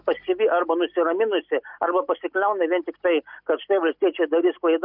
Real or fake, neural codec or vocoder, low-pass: real; none; 5.4 kHz